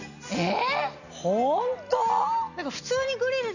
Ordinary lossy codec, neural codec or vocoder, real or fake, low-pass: none; none; real; 7.2 kHz